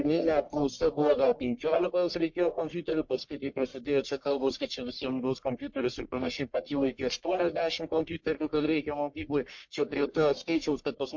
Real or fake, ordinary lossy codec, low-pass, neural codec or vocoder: fake; MP3, 48 kbps; 7.2 kHz; codec, 44.1 kHz, 1.7 kbps, Pupu-Codec